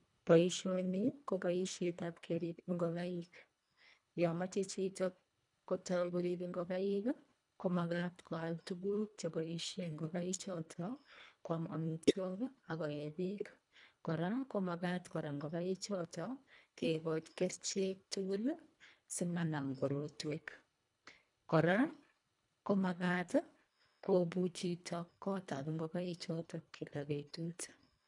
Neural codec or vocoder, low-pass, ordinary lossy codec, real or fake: codec, 24 kHz, 1.5 kbps, HILCodec; 10.8 kHz; none; fake